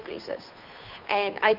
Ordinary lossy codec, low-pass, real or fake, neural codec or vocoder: none; 5.4 kHz; fake; codec, 16 kHz, 2 kbps, FunCodec, trained on Chinese and English, 25 frames a second